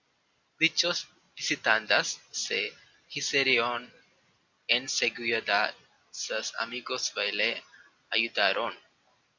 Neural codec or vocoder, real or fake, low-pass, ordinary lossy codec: none; real; 7.2 kHz; Opus, 64 kbps